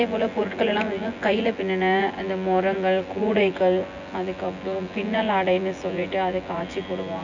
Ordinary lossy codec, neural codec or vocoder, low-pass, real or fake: none; vocoder, 24 kHz, 100 mel bands, Vocos; 7.2 kHz; fake